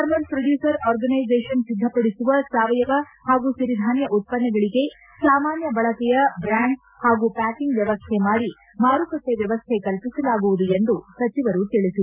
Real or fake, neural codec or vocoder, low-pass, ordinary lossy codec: real; none; 3.6 kHz; none